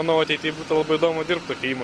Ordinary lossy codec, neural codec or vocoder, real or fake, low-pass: Opus, 24 kbps; none; real; 10.8 kHz